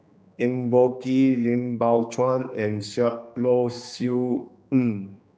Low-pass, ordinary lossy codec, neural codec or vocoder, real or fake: none; none; codec, 16 kHz, 2 kbps, X-Codec, HuBERT features, trained on general audio; fake